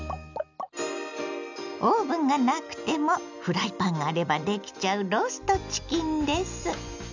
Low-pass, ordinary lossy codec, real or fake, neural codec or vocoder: 7.2 kHz; none; real; none